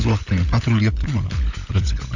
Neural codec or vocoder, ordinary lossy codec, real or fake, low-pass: codec, 16 kHz, 16 kbps, FunCodec, trained on LibriTTS, 50 frames a second; none; fake; 7.2 kHz